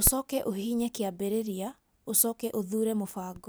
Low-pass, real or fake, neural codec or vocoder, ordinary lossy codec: none; real; none; none